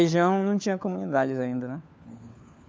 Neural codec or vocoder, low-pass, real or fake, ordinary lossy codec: codec, 16 kHz, 4 kbps, FunCodec, trained on LibriTTS, 50 frames a second; none; fake; none